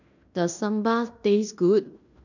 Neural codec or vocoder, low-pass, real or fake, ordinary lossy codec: codec, 16 kHz in and 24 kHz out, 0.9 kbps, LongCat-Audio-Codec, fine tuned four codebook decoder; 7.2 kHz; fake; none